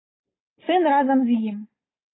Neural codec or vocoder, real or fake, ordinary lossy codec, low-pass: none; real; AAC, 16 kbps; 7.2 kHz